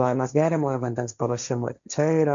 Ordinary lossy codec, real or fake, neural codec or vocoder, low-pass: MP3, 64 kbps; fake; codec, 16 kHz, 1.1 kbps, Voila-Tokenizer; 7.2 kHz